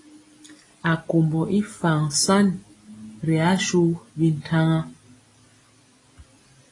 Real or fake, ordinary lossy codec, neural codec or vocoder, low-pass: real; AAC, 32 kbps; none; 10.8 kHz